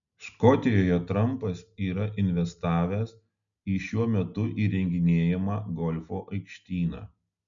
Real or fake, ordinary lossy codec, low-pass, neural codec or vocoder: real; MP3, 96 kbps; 7.2 kHz; none